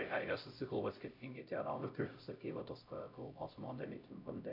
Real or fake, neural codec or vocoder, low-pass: fake; codec, 16 kHz, 0.5 kbps, X-Codec, HuBERT features, trained on LibriSpeech; 5.4 kHz